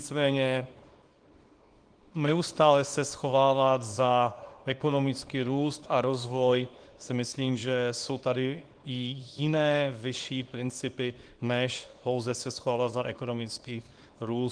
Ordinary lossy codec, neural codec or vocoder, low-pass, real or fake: Opus, 24 kbps; codec, 24 kHz, 0.9 kbps, WavTokenizer, small release; 9.9 kHz; fake